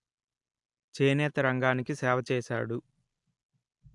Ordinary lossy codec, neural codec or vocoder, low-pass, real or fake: AAC, 64 kbps; none; 10.8 kHz; real